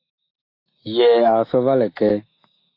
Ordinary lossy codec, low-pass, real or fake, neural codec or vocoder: AAC, 32 kbps; 5.4 kHz; fake; autoencoder, 48 kHz, 128 numbers a frame, DAC-VAE, trained on Japanese speech